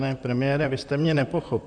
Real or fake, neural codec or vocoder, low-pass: fake; vocoder, 44.1 kHz, 128 mel bands, Pupu-Vocoder; 9.9 kHz